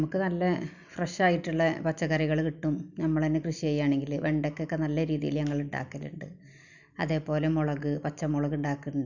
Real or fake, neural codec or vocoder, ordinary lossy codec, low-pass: real; none; none; 7.2 kHz